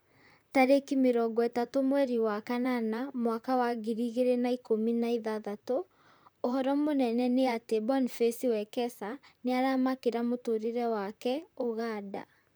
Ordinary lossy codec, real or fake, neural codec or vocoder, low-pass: none; fake; vocoder, 44.1 kHz, 128 mel bands, Pupu-Vocoder; none